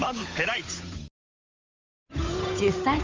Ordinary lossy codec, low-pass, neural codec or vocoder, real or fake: Opus, 32 kbps; 7.2 kHz; codec, 16 kHz, 8 kbps, FunCodec, trained on Chinese and English, 25 frames a second; fake